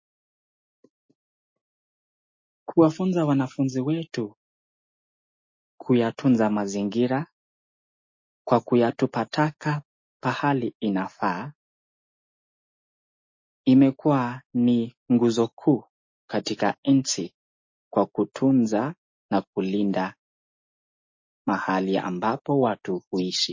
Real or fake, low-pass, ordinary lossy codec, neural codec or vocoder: real; 7.2 kHz; MP3, 32 kbps; none